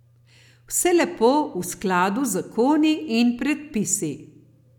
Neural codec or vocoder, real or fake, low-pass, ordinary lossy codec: none; real; 19.8 kHz; none